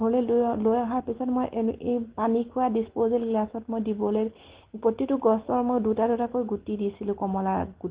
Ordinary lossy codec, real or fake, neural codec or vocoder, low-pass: Opus, 16 kbps; real; none; 3.6 kHz